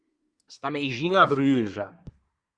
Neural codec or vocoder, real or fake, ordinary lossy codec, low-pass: codec, 24 kHz, 1 kbps, SNAC; fake; Opus, 64 kbps; 9.9 kHz